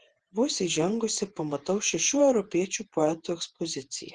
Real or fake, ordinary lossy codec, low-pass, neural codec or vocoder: fake; Opus, 16 kbps; 10.8 kHz; vocoder, 48 kHz, 128 mel bands, Vocos